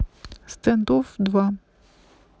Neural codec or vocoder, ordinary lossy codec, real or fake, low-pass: none; none; real; none